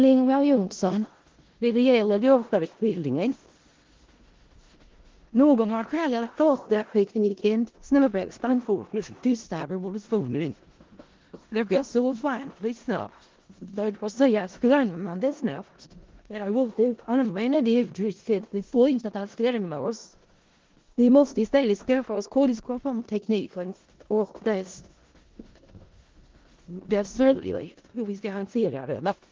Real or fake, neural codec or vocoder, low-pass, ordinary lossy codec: fake; codec, 16 kHz in and 24 kHz out, 0.4 kbps, LongCat-Audio-Codec, four codebook decoder; 7.2 kHz; Opus, 16 kbps